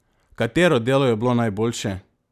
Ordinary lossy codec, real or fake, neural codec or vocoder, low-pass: none; real; none; 14.4 kHz